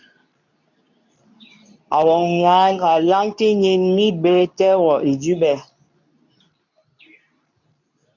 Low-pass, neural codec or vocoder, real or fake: 7.2 kHz; codec, 24 kHz, 0.9 kbps, WavTokenizer, medium speech release version 2; fake